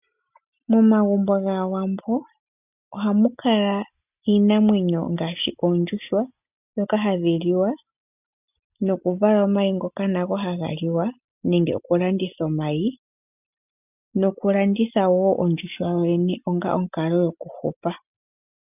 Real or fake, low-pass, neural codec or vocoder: real; 3.6 kHz; none